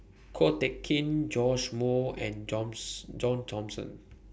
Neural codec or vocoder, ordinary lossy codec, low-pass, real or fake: none; none; none; real